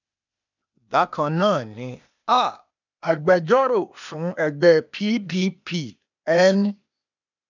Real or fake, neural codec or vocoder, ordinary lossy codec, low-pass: fake; codec, 16 kHz, 0.8 kbps, ZipCodec; none; 7.2 kHz